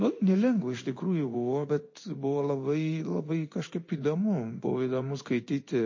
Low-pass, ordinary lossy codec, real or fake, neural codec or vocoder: 7.2 kHz; MP3, 32 kbps; fake; codec, 16 kHz in and 24 kHz out, 1 kbps, XY-Tokenizer